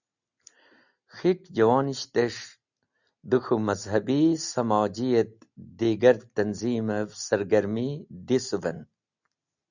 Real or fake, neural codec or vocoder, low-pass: real; none; 7.2 kHz